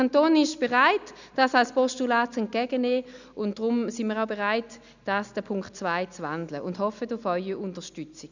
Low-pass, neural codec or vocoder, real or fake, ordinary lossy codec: 7.2 kHz; none; real; none